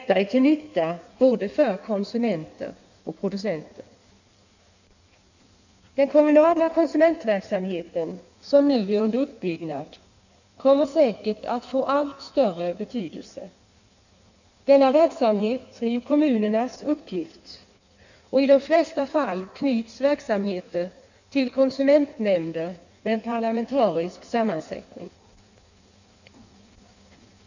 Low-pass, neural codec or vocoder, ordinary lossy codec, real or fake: 7.2 kHz; codec, 16 kHz in and 24 kHz out, 1.1 kbps, FireRedTTS-2 codec; none; fake